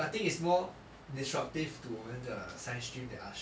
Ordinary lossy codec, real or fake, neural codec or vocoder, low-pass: none; real; none; none